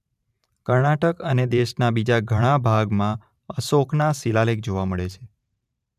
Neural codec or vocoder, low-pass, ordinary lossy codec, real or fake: vocoder, 44.1 kHz, 128 mel bands every 512 samples, BigVGAN v2; 14.4 kHz; none; fake